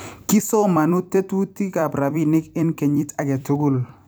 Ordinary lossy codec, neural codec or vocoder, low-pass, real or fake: none; vocoder, 44.1 kHz, 128 mel bands every 256 samples, BigVGAN v2; none; fake